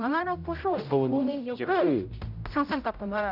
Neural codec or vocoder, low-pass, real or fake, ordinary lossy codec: codec, 16 kHz, 0.5 kbps, X-Codec, HuBERT features, trained on general audio; 5.4 kHz; fake; none